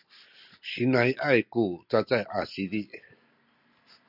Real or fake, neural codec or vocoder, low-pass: real; none; 5.4 kHz